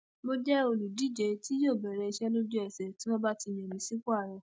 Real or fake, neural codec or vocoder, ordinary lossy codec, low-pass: real; none; none; none